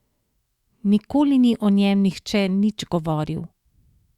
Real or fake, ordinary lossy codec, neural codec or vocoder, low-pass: fake; Opus, 64 kbps; autoencoder, 48 kHz, 128 numbers a frame, DAC-VAE, trained on Japanese speech; 19.8 kHz